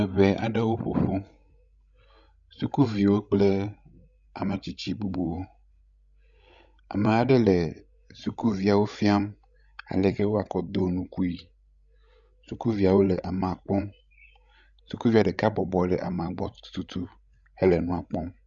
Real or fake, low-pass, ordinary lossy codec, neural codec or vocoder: fake; 7.2 kHz; Opus, 64 kbps; codec, 16 kHz, 16 kbps, FreqCodec, larger model